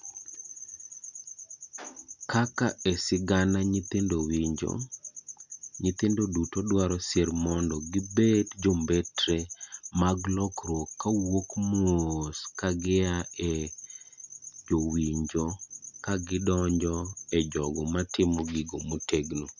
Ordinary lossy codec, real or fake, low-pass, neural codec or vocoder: none; real; 7.2 kHz; none